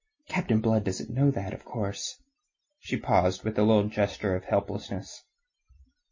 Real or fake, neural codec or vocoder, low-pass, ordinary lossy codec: real; none; 7.2 kHz; MP3, 32 kbps